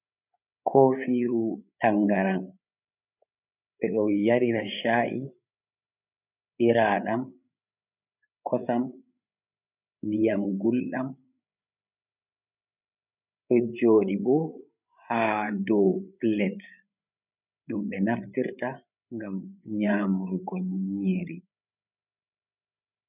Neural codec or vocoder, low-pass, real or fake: codec, 16 kHz, 8 kbps, FreqCodec, larger model; 3.6 kHz; fake